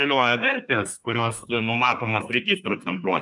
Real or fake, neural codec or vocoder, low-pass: fake; codec, 24 kHz, 1 kbps, SNAC; 10.8 kHz